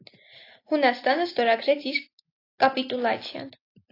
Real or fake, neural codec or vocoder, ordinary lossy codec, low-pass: real; none; AAC, 32 kbps; 5.4 kHz